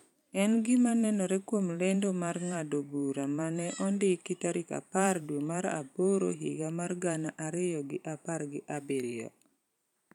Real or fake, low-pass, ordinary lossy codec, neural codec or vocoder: fake; 19.8 kHz; none; vocoder, 44.1 kHz, 128 mel bands every 512 samples, BigVGAN v2